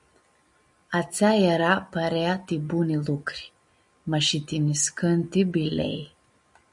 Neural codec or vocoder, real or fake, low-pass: none; real; 10.8 kHz